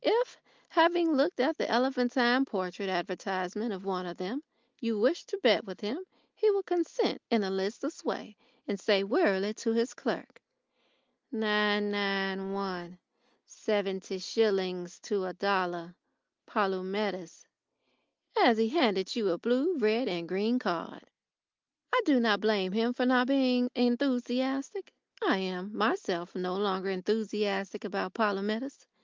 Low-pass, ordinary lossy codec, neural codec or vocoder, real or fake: 7.2 kHz; Opus, 24 kbps; none; real